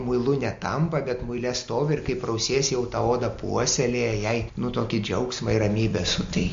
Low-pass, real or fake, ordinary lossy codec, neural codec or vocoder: 7.2 kHz; real; MP3, 48 kbps; none